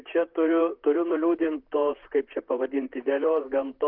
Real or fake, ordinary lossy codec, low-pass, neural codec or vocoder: fake; Opus, 32 kbps; 5.4 kHz; vocoder, 44.1 kHz, 128 mel bands, Pupu-Vocoder